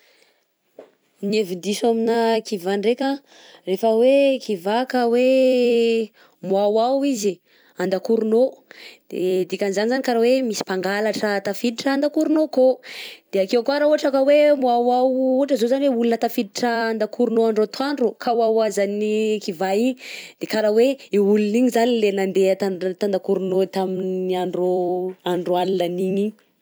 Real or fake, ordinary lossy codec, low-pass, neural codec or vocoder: fake; none; none; vocoder, 44.1 kHz, 128 mel bands every 256 samples, BigVGAN v2